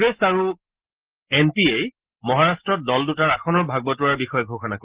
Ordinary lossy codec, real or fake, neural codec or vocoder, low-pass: Opus, 16 kbps; real; none; 3.6 kHz